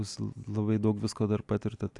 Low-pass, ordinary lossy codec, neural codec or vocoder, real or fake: 10.8 kHz; AAC, 64 kbps; none; real